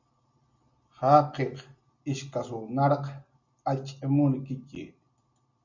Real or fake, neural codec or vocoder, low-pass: real; none; 7.2 kHz